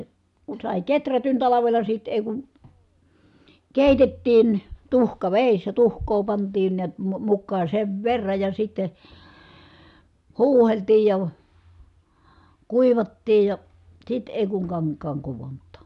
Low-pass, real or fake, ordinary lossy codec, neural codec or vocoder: 10.8 kHz; real; none; none